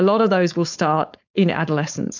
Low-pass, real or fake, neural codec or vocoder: 7.2 kHz; fake; codec, 16 kHz, 4.8 kbps, FACodec